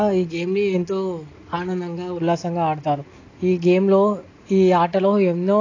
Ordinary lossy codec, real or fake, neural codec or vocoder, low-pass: AAC, 32 kbps; real; none; 7.2 kHz